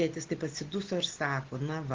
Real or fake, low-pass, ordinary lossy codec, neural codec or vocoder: real; 7.2 kHz; Opus, 16 kbps; none